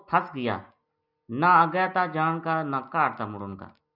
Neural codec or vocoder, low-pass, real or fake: none; 5.4 kHz; real